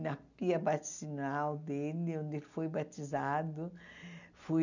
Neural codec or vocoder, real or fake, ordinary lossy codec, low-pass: none; real; none; 7.2 kHz